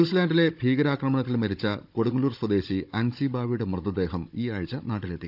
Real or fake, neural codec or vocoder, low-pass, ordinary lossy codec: fake; codec, 16 kHz, 16 kbps, FunCodec, trained on Chinese and English, 50 frames a second; 5.4 kHz; none